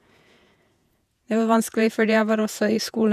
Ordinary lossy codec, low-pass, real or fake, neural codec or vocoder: none; 14.4 kHz; fake; vocoder, 48 kHz, 128 mel bands, Vocos